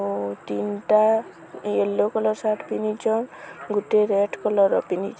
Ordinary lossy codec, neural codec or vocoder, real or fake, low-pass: none; none; real; none